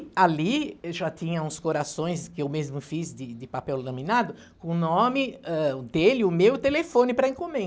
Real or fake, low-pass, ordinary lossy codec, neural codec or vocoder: real; none; none; none